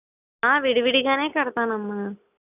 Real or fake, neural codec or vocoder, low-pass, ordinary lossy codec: real; none; 3.6 kHz; none